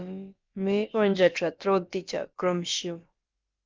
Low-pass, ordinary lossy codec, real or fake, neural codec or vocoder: 7.2 kHz; Opus, 32 kbps; fake; codec, 16 kHz, about 1 kbps, DyCAST, with the encoder's durations